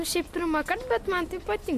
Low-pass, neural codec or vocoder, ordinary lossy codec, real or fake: 14.4 kHz; none; MP3, 64 kbps; real